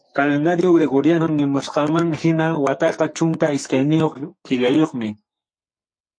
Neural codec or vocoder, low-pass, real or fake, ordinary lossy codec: codec, 44.1 kHz, 2.6 kbps, SNAC; 9.9 kHz; fake; MP3, 48 kbps